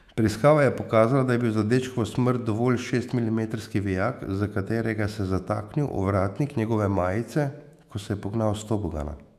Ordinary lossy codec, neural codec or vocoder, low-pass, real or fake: none; autoencoder, 48 kHz, 128 numbers a frame, DAC-VAE, trained on Japanese speech; 14.4 kHz; fake